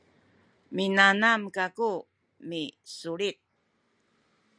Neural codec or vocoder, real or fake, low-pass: none; real; 9.9 kHz